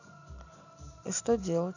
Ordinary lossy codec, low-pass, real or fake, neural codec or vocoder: none; 7.2 kHz; fake; vocoder, 44.1 kHz, 128 mel bands every 512 samples, BigVGAN v2